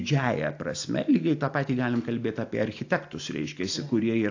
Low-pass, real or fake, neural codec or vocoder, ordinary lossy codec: 7.2 kHz; real; none; AAC, 48 kbps